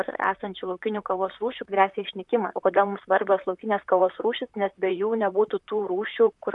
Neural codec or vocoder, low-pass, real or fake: vocoder, 44.1 kHz, 128 mel bands, Pupu-Vocoder; 10.8 kHz; fake